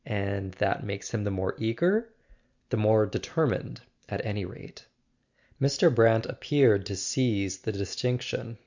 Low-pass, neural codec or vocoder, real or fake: 7.2 kHz; none; real